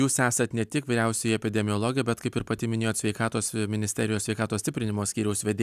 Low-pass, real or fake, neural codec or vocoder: 14.4 kHz; real; none